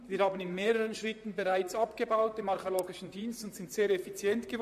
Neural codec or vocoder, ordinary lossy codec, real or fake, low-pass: vocoder, 44.1 kHz, 128 mel bands, Pupu-Vocoder; MP3, 64 kbps; fake; 14.4 kHz